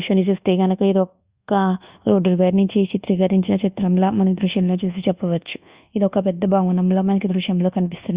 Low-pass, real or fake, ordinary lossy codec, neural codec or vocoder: 3.6 kHz; fake; Opus, 64 kbps; codec, 24 kHz, 1.2 kbps, DualCodec